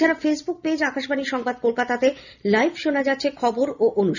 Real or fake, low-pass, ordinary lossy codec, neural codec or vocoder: real; 7.2 kHz; none; none